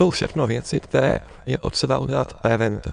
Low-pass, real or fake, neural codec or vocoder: 9.9 kHz; fake; autoencoder, 22.05 kHz, a latent of 192 numbers a frame, VITS, trained on many speakers